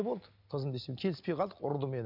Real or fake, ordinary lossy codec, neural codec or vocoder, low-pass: real; none; none; 5.4 kHz